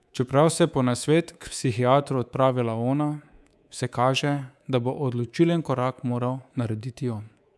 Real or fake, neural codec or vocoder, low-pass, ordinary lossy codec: fake; codec, 24 kHz, 3.1 kbps, DualCodec; none; none